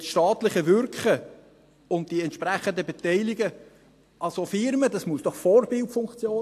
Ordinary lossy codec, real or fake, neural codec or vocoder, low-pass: AAC, 64 kbps; real; none; 14.4 kHz